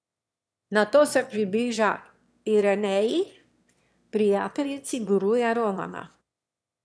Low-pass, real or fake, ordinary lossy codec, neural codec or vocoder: none; fake; none; autoencoder, 22.05 kHz, a latent of 192 numbers a frame, VITS, trained on one speaker